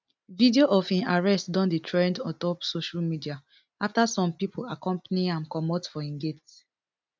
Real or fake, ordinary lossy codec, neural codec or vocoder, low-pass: real; none; none; none